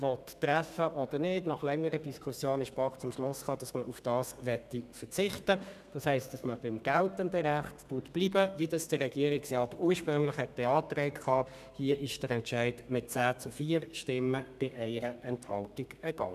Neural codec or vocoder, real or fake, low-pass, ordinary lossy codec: codec, 32 kHz, 1.9 kbps, SNAC; fake; 14.4 kHz; none